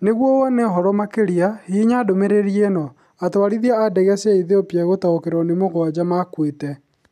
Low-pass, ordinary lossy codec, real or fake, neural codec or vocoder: 14.4 kHz; none; real; none